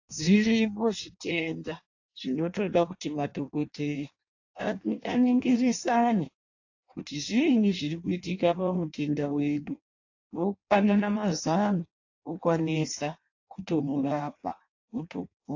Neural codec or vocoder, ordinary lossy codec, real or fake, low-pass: codec, 16 kHz in and 24 kHz out, 0.6 kbps, FireRedTTS-2 codec; AAC, 48 kbps; fake; 7.2 kHz